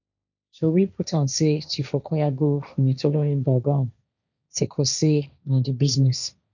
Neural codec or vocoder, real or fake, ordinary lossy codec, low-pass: codec, 16 kHz, 1.1 kbps, Voila-Tokenizer; fake; none; 7.2 kHz